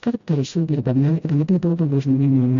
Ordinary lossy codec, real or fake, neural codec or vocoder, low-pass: AAC, 64 kbps; fake; codec, 16 kHz, 0.5 kbps, FreqCodec, smaller model; 7.2 kHz